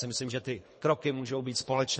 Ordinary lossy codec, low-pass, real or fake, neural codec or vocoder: MP3, 32 kbps; 10.8 kHz; fake; codec, 24 kHz, 3 kbps, HILCodec